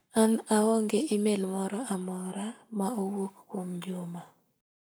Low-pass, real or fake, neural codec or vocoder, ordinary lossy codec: none; fake; codec, 44.1 kHz, 7.8 kbps, Pupu-Codec; none